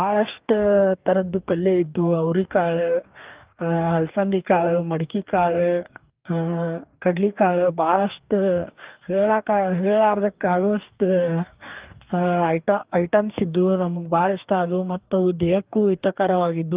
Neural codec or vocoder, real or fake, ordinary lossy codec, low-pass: codec, 44.1 kHz, 2.6 kbps, DAC; fake; Opus, 32 kbps; 3.6 kHz